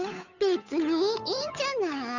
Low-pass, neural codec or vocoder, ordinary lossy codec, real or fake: 7.2 kHz; codec, 16 kHz, 8 kbps, FunCodec, trained on Chinese and English, 25 frames a second; none; fake